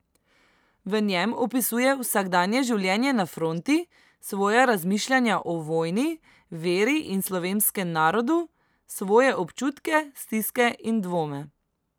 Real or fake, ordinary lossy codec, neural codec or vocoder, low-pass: real; none; none; none